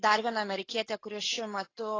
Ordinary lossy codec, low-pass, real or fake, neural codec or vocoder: AAC, 32 kbps; 7.2 kHz; real; none